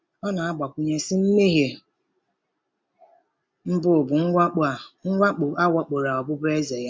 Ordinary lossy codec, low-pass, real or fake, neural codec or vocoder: none; none; real; none